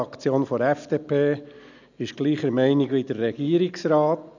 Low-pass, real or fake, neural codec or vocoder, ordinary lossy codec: 7.2 kHz; real; none; none